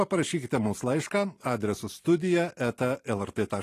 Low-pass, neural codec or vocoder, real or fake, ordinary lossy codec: 14.4 kHz; vocoder, 44.1 kHz, 128 mel bands every 512 samples, BigVGAN v2; fake; AAC, 48 kbps